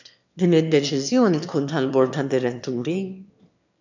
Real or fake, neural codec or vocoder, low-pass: fake; autoencoder, 22.05 kHz, a latent of 192 numbers a frame, VITS, trained on one speaker; 7.2 kHz